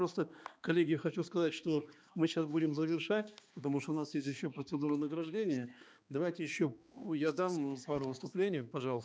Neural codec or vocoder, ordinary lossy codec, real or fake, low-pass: codec, 16 kHz, 2 kbps, X-Codec, HuBERT features, trained on balanced general audio; none; fake; none